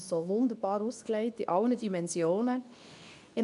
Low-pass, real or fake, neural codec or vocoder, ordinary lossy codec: 10.8 kHz; fake; codec, 24 kHz, 0.9 kbps, WavTokenizer, medium speech release version 2; none